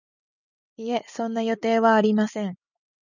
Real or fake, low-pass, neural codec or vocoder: real; 7.2 kHz; none